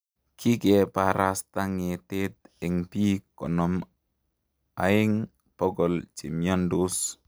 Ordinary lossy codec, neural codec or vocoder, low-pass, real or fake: none; none; none; real